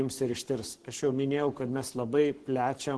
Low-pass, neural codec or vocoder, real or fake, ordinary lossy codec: 10.8 kHz; codec, 44.1 kHz, 7.8 kbps, Pupu-Codec; fake; Opus, 16 kbps